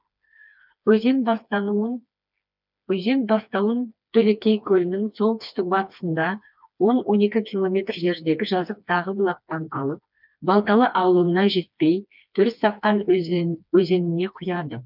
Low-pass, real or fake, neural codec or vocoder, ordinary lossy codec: 5.4 kHz; fake; codec, 16 kHz, 2 kbps, FreqCodec, smaller model; none